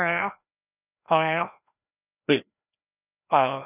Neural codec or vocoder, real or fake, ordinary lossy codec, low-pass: codec, 16 kHz, 1 kbps, FreqCodec, larger model; fake; none; 3.6 kHz